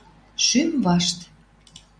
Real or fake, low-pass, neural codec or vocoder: real; 9.9 kHz; none